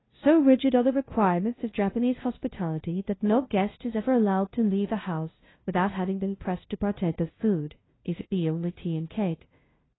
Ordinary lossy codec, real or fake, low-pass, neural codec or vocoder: AAC, 16 kbps; fake; 7.2 kHz; codec, 16 kHz, 0.5 kbps, FunCodec, trained on LibriTTS, 25 frames a second